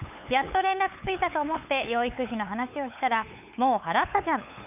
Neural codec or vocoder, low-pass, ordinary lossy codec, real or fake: codec, 16 kHz, 8 kbps, FunCodec, trained on LibriTTS, 25 frames a second; 3.6 kHz; none; fake